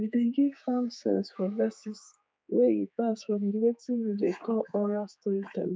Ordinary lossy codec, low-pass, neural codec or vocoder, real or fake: none; none; codec, 16 kHz, 4 kbps, X-Codec, HuBERT features, trained on general audio; fake